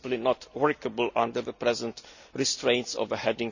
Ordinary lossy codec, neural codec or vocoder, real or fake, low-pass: none; none; real; 7.2 kHz